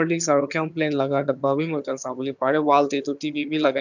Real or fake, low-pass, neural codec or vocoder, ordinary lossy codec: fake; 7.2 kHz; vocoder, 22.05 kHz, 80 mel bands, HiFi-GAN; none